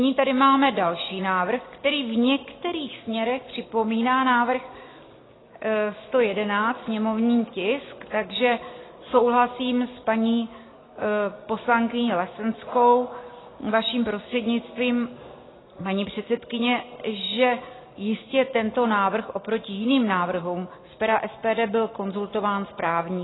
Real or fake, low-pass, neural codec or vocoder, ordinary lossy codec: real; 7.2 kHz; none; AAC, 16 kbps